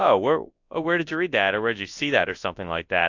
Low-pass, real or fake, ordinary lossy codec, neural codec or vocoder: 7.2 kHz; fake; AAC, 48 kbps; codec, 24 kHz, 0.9 kbps, WavTokenizer, large speech release